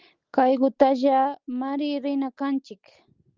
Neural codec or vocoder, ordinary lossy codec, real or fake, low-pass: none; Opus, 24 kbps; real; 7.2 kHz